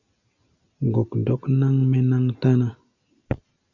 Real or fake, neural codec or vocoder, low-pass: real; none; 7.2 kHz